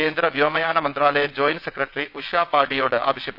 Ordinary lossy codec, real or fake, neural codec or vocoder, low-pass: none; fake; vocoder, 22.05 kHz, 80 mel bands, WaveNeXt; 5.4 kHz